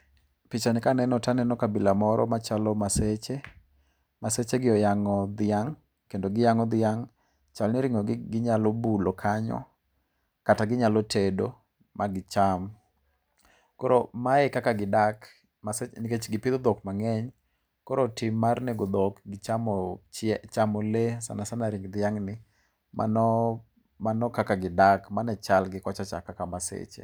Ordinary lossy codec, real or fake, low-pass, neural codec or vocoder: none; real; none; none